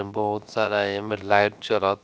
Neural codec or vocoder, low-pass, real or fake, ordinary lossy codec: codec, 16 kHz, 0.7 kbps, FocalCodec; none; fake; none